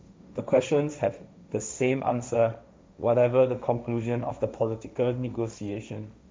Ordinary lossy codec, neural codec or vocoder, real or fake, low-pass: none; codec, 16 kHz, 1.1 kbps, Voila-Tokenizer; fake; none